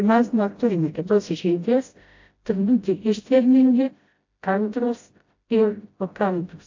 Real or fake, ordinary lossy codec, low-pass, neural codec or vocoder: fake; AAC, 48 kbps; 7.2 kHz; codec, 16 kHz, 0.5 kbps, FreqCodec, smaller model